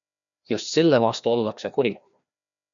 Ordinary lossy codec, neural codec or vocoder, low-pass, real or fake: MP3, 96 kbps; codec, 16 kHz, 1 kbps, FreqCodec, larger model; 7.2 kHz; fake